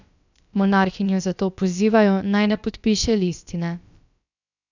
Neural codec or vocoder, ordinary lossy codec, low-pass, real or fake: codec, 16 kHz, about 1 kbps, DyCAST, with the encoder's durations; none; 7.2 kHz; fake